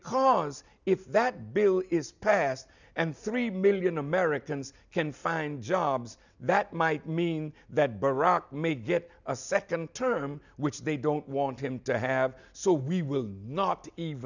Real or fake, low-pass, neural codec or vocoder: real; 7.2 kHz; none